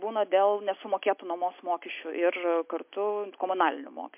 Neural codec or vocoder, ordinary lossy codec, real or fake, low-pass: none; MP3, 32 kbps; real; 3.6 kHz